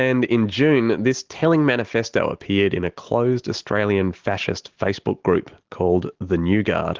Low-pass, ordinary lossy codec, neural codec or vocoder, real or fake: 7.2 kHz; Opus, 16 kbps; none; real